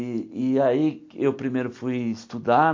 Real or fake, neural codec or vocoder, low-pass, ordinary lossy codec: real; none; 7.2 kHz; MP3, 48 kbps